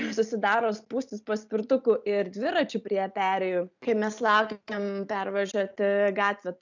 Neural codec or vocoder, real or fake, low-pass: none; real; 7.2 kHz